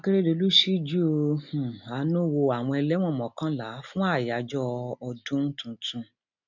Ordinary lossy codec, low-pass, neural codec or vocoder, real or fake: none; 7.2 kHz; none; real